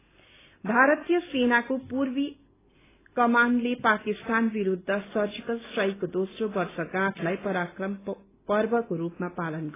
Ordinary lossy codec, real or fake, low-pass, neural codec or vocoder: AAC, 16 kbps; real; 3.6 kHz; none